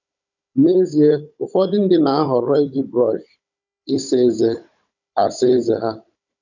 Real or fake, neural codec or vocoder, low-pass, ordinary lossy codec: fake; codec, 16 kHz, 16 kbps, FunCodec, trained on Chinese and English, 50 frames a second; 7.2 kHz; none